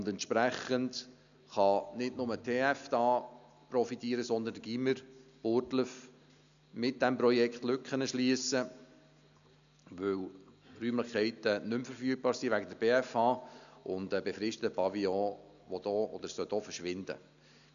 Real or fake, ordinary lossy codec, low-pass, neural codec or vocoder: real; none; 7.2 kHz; none